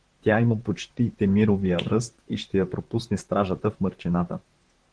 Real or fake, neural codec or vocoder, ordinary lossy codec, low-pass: fake; vocoder, 44.1 kHz, 128 mel bands, Pupu-Vocoder; Opus, 16 kbps; 9.9 kHz